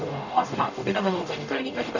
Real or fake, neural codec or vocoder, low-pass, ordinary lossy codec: fake; codec, 44.1 kHz, 0.9 kbps, DAC; 7.2 kHz; none